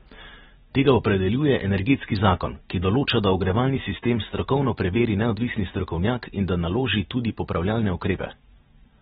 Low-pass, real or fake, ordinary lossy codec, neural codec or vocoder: 19.8 kHz; real; AAC, 16 kbps; none